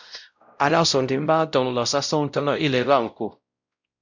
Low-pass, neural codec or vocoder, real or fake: 7.2 kHz; codec, 16 kHz, 0.5 kbps, X-Codec, WavLM features, trained on Multilingual LibriSpeech; fake